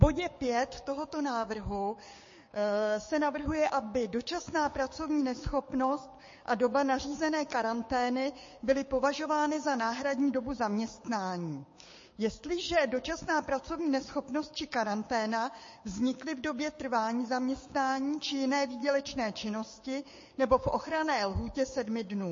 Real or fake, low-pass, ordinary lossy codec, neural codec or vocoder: fake; 7.2 kHz; MP3, 32 kbps; codec, 16 kHz, 6 kbps, DAC